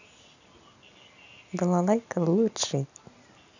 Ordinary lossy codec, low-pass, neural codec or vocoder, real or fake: none; 7.2 kHz; none; real